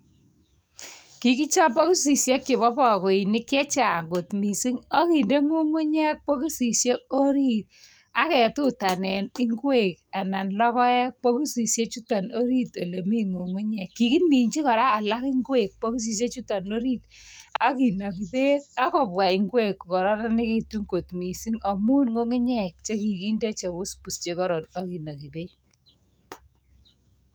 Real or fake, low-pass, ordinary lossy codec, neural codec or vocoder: fake; none; none; codec, 44.1 kHz, 7.8 kbps, DAC